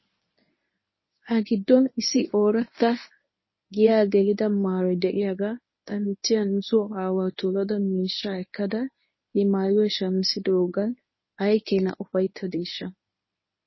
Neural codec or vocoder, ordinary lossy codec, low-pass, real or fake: codec, 24 kHz, 0.9 kbps, WavTokenizer, medium speech release version 1; MP3, 24 kbps; 7.2 kHz; fake